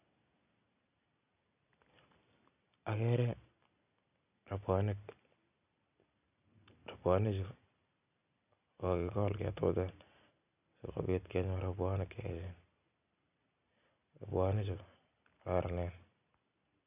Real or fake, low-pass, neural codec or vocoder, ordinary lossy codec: real; 3.6 kHz; none; none